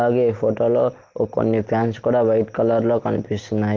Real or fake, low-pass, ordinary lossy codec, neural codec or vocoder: real; 7.2 kHz; Opus, 16 kbps; none